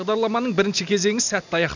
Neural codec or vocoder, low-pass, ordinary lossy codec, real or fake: none; 7.2 kHz; none; real